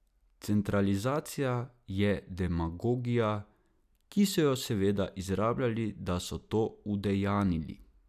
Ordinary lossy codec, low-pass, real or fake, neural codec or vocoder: none; 14.4 kHz; real; none